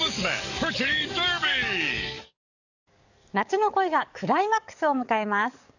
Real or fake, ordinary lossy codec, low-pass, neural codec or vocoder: fake; none; 7.2 kHz; codec, 44.1 kHz, 7.8 kbps, DAC